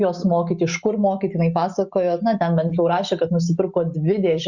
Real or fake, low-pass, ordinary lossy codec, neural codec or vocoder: real; 7.2 kHz; Opus, 64 kbps; none